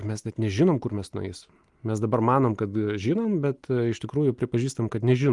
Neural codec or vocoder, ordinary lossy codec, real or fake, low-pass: none; Opus, 32 kbps; real; 10.8 kHz